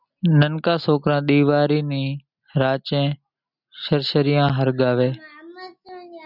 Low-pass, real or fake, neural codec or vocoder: 5.4 kHz; real; none